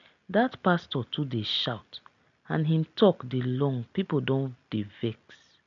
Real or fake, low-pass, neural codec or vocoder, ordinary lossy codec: real; 7.2 kHz; none; none